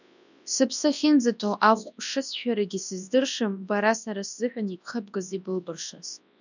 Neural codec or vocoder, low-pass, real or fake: codec, 24 kHz, 0.9 kbps, WavTokenizer, large speech release; 7.2 kHz; fake